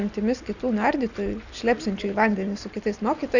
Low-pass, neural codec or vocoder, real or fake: 7.2 kHz; none; real